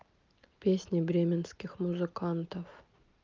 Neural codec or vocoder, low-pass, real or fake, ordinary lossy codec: none; 7.2 kHz; real; Opus, 32 kbps